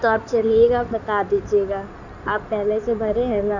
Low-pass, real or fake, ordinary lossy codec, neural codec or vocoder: 7.2 kHz; fake; none; codec, 16 kHz in and 24 kHz out, 2.2 kbps, FireRedTTS-2 codec